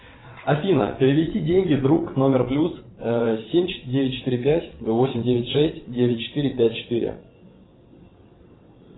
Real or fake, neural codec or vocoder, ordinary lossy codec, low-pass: fake; vocoder, 22.05 kHz, 80 mel bands, WaveNeXt; AAC, 16 kbps; 7.2 kHz